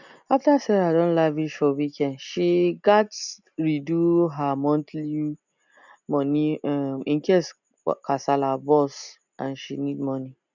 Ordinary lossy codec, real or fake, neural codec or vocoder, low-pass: none; real; none; 7.2 kHz